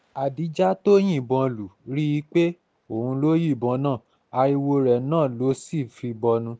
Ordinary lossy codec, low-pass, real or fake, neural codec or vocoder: none; none; real; none